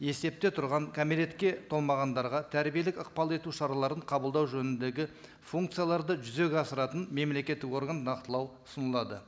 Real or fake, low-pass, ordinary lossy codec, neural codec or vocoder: real; none; none; none